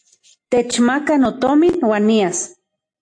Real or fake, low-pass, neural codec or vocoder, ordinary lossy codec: real; 9.9 kHz; none; AAC, 48 kbps